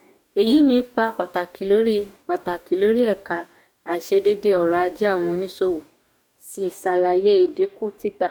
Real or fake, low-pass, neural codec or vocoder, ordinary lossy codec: fake; 19.8 kHz; codec, 44.1 kHz, 2.6 kbps, DAC; none